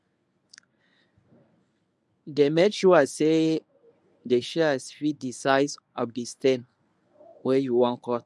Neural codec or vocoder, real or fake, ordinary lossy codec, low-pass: codec, 24 kHz, 0.9 kbps, WavTokenizer, medium speech release version 1; fake; none; none